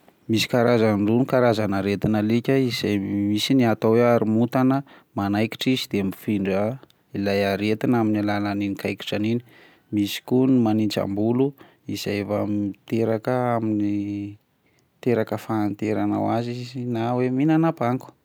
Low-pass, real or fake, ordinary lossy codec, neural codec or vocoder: none; real; none; none